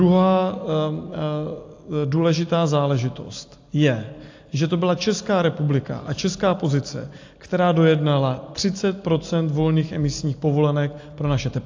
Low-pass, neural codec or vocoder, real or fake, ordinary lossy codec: 7.2 kHz; none; real; AAC, 48 kbps